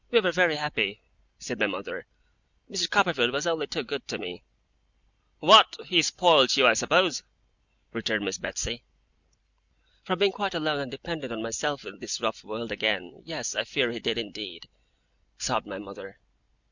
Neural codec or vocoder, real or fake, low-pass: none; real; 7.2 kHz